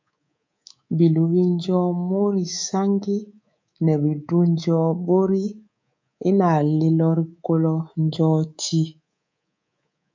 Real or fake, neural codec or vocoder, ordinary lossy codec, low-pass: fake; codec, 24 kHz, 3.1 kbps, DualCodec; MP3, 64 kbps; 7.2 kHz